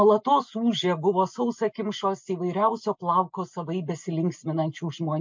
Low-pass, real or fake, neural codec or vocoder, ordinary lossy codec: 7.2 kHz; real; none; MP3, 64 kbps